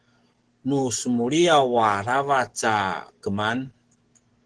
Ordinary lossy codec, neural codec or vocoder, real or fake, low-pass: Opus, 16 kbps; codec, 44.1 kHz, 7.8 kbps, DAC; fake; 10.8 kHz